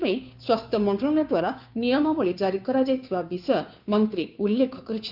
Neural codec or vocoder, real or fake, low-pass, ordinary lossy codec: codec, 16 kHz, 2 kbps, FunCodec, trained on Chinese and English, 25 frames a second; fake; 5.4 kHz; none